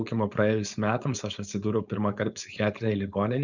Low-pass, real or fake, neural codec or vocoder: 7.2 kHz; fake; codec, 16 kHz, 4.8 kbps, FACodec